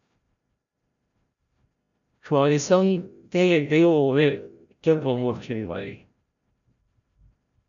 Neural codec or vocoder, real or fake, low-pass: codec, 16 kHz, 0.5 kbps, FreqCodec, larger model; fake; 7.2 kHz